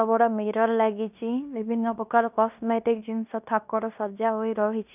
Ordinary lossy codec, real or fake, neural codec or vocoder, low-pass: none; fake; codec, 16 kHz in and 24 kHz out, 0.9 kbps, LongCat-Audio-Codec, fine tuned four codebook decoder; 3.6 kHz